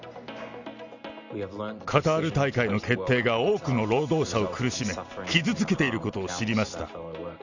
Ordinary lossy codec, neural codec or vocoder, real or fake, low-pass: none; none; real; 7.2 kHz